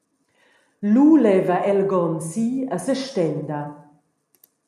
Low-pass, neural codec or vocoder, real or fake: 14.4 kHz; none; real